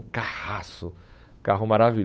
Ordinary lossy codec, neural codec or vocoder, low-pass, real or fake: none; codec, 16 kHz, 8 kbps, FunCodec, trained on Chinese and English, 25 frames a second; none; fake